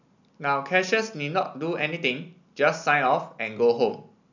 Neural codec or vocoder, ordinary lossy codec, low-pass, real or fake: none; none; 7.2 kHz; real